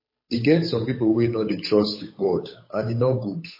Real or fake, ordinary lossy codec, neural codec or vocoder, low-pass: fake; MP3, 24 kbps; codec, 16 kHz, 8 kbps, FunCodec, trained on Chinese and English, 25 frames a second; 5.4 kHz